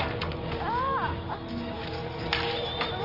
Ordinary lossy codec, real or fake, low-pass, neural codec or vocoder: Opus, 24 kbps; real; 5.4 kHz; none